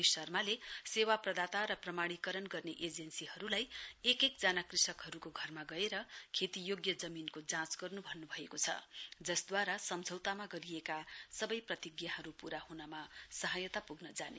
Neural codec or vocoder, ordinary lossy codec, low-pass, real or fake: none; none; none; real